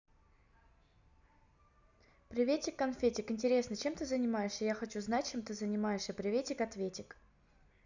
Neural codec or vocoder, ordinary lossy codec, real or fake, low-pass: none; none; real; 7.2 kHz